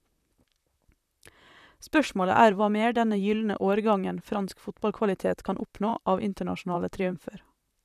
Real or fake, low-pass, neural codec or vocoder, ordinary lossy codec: fake; 14.4 kHz; vocoder, 44.1 kHz, 128 mel bands, Pupu-Vocoder; none